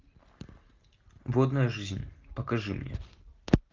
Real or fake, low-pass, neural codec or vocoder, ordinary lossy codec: real; 7.2 kHz; none; Opus, 32 kbps